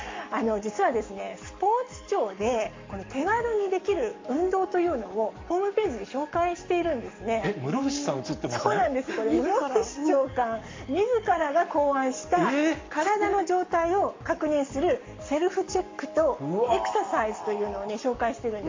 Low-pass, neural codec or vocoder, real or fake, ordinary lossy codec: 7.2 kHz; codec, 44.1 kHz, 7.8 kbps, Pupu-Codec; fake; MP3, 48 kbps